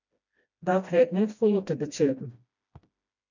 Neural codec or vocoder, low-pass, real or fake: codec, 16 kHz, 1 kbps, FreqCodec, smaller model; 7.2 kHz; fake